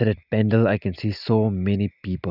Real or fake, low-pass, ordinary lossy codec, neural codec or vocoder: real; 5.4 kHz; none; none